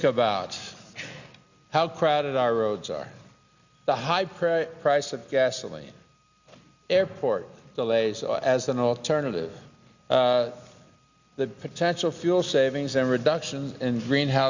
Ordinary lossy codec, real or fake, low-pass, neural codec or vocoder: Opus, 64 kbps; real; 7.2 kHz; none